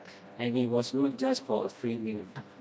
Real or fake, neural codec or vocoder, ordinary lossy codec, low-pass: fake; codec, 16 kHz, 1 kbps, FreqCodec, smaller model; none; none